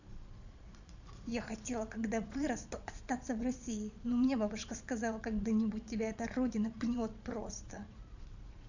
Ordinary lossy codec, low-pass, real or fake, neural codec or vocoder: none; 7.2 kHz; fake; vocoder, 22.05 kHz, 80 mel bands, Vocos